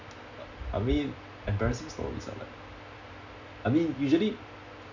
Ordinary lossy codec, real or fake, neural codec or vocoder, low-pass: none; real; none; 7.2 kHz